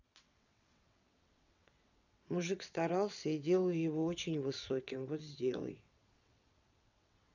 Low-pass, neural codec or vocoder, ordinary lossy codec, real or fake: 7.2 kHz; vocoder, 22.05 kHz, 80 mel bands, WaveNeXt; none; fake